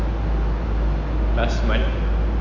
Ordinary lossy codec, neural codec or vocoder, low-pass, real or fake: MP3, 64 kbps; none; 7.2 kHz; real